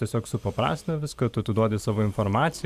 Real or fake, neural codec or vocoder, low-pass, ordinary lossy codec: fake; vocoder, 44.1 kHz, 128 mel bands every 512 samples, BigVGAN v2; 14.4 kHz; Opus, 64 kbps